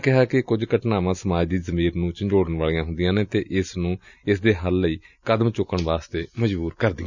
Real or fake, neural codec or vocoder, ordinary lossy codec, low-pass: real; none; none; 7.2 kHz